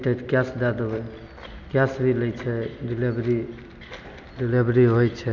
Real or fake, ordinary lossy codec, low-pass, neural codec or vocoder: real; none; 7.2 kHz; none